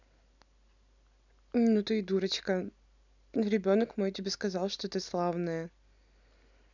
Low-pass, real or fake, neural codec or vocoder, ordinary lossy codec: 7.2 kHz; real; none; none